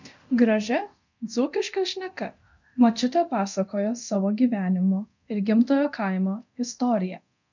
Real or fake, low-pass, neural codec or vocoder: fake; 7.2 kHz; codec, 24 kHz, 0.9 kbps, DualCodec